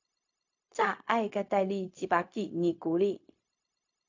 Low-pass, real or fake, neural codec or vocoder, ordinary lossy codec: 7.2 kHz; fake; codec, 16 kHz, 0.4 kbps, LongCat-Audio-Codec; AAC, 48 kbps